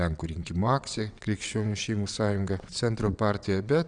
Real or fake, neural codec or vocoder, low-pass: fake; vocoder, 22.05 kHz, 80 mel bands, WaveNeXt; 9.9 kHz